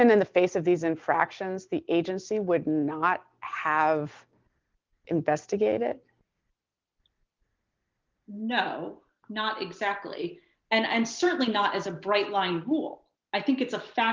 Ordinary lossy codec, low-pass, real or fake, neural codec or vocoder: Opus, 32 kbps; 7.2 kHz; real; none